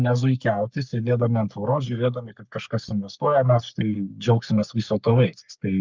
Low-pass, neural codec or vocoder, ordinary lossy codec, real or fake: 7.2 kHz; codec, 44.1 kHz, 3.4 kbps, Pupu-Codec; Opus, 24 kbps; fake